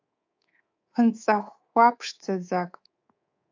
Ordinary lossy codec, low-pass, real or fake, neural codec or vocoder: AAC, 48 kbps; 7.2 kHz; fake; codec, 24 kHz, 3.1 kbps, DualCodec